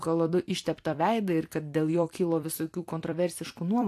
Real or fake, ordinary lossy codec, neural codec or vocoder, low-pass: fake; AAC, 64 kbps; vocoder, 44.1 kHz, 128 mel bands every 256 samples, BigVGAN v2; 14.4 kHz